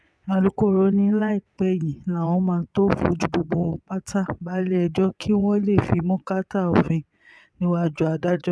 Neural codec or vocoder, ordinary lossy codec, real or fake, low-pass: vocoder, 22.05 kHz, 80 mel bands, WaveNeXt; none; fake; none